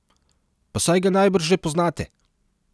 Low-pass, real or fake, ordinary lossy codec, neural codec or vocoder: none; real; none; none